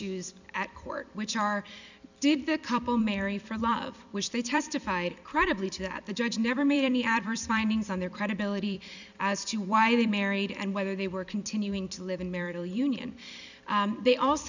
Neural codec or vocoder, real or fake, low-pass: vocoder, 44.1 kHz, 128 mel bands every 256 samples, BigVGAN v2; fake; 7.2 kHz